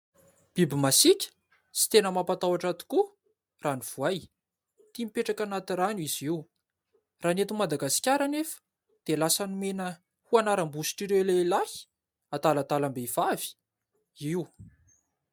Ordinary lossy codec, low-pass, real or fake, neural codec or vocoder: MP3, 96 kbps; 19.8 kHz; real; none